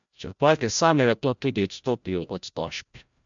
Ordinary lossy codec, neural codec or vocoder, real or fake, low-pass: MP3, 64 kbps; codec, 16 kHz, 0.5 kbps, FreqCodec, larger model; fake; 7.2 kHz